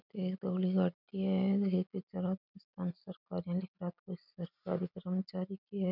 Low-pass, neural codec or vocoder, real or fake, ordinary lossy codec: 5.4 kHz; none; real; none